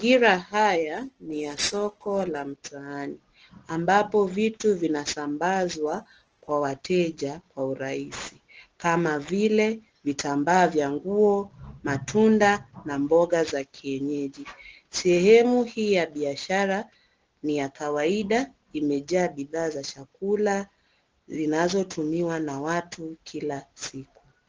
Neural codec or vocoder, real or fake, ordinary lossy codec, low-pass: none; real; Opus, 16 kbps; 7.2 kHz